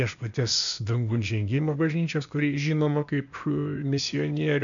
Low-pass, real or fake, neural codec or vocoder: 7.2 kHz; fake; codec, 16 kHz, 0.8 kbps, ZipCodec